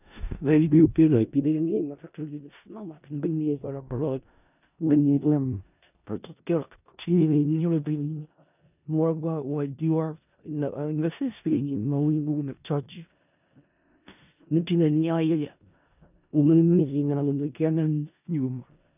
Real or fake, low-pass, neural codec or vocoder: fake; 3.6 kHz; codec, 16 kHz in and 24 kHz out, 0.4 kbps, LongCat-Audio-Codec, four codebook decoder